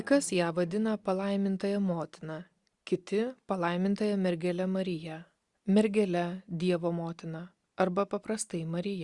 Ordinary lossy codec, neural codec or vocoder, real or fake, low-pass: Opus, 64 kbps; none; real; 10.8 kHz